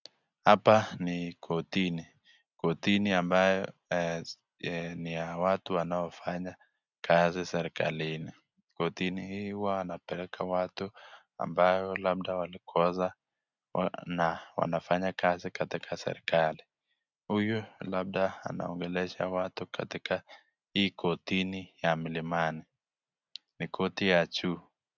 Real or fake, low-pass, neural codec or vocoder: real; 7.2 kHz; none